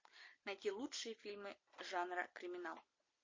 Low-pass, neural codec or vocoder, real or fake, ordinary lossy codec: 7.2 kHz; none; real; MP3, 32 kbps